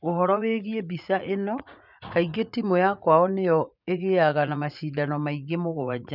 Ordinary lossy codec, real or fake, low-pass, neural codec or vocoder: none; fake; 5.4 kHz; vocoder, 44.1 kHz, 80 mel bands, Vocos